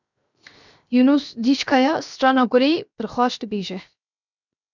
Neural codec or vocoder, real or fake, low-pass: codec, 16 kHz, 0.7 kbps, FocalCodec; fake; 7.2 kHz